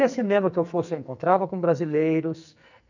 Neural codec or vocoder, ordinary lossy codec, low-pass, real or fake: codec, 44.1 kHz, 2.6 kbps, SNAC; none; 7.2 kHz; fake